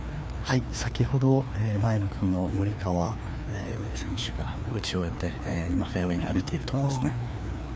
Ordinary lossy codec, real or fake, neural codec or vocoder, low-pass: none; fake; codec, 16 kHz, 2 kbps, FreqCodec, larger model; none